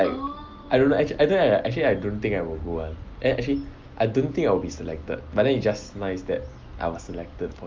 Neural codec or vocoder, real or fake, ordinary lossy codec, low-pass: none; real; Opus, 32 kbps; 7.2 kHz